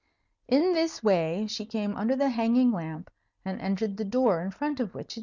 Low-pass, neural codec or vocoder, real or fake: 7.2 kHz; none; real